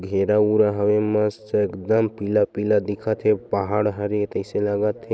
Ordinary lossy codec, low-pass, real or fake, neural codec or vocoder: none; none; real; none